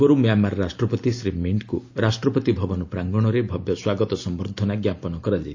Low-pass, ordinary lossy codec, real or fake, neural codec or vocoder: 7.2 kHz; AAC, 48 kbps; real; none